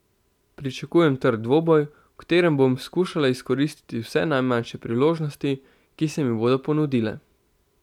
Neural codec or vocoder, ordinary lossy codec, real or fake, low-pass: none; none; real; 19.8 kHz